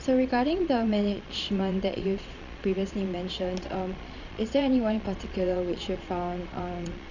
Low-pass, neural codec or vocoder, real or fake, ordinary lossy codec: 7.2 kHz; vocoder, 22.05 kHz, 80 mel bands, Vocos; fake; none